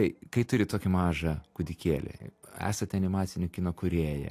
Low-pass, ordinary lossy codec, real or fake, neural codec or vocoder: 14.4 kHz; AAC, 64 kbps; real; none